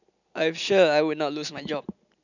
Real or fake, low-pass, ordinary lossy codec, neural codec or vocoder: real; 7.2 kHz; none; none